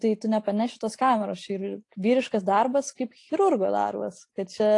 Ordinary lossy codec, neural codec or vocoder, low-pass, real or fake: AAC, 48 kbps; none; 10.8 kHz; real